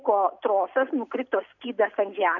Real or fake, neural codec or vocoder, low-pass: real; none; 7.2 kHz